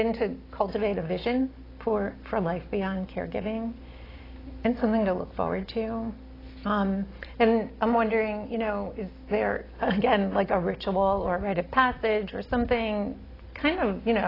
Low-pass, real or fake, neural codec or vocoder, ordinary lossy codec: 5.4 kHz; real; none; AAC, 24 kbps